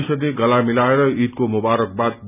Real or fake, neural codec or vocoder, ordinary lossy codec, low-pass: real; none; none; 3.6 kHz